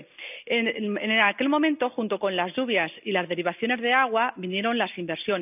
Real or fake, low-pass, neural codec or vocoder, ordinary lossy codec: real; 3.6 kHz; none; none